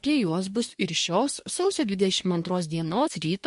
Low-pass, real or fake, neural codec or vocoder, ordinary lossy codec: 10.8 kHz; fake; codec, 24 kHz, 1 kbps, SNAC; MP3, 48 kbps